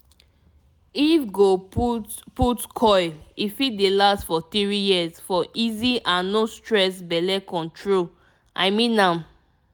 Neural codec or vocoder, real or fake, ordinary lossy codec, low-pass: none; real; none; none